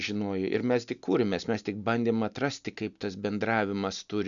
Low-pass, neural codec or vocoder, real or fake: 7.2 kHz; none; real